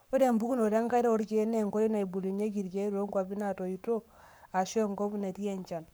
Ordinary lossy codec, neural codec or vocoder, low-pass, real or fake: none; codec, 44.1 kHz, 7.8 kbps, Pupu-Codec; none; fake